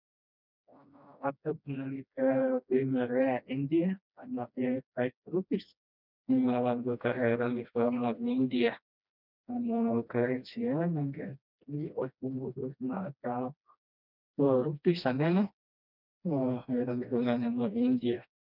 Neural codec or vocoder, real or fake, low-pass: codec, 16 kHz, 1 kbps, FreqCodec, smaller model; fake; 5.4 kHz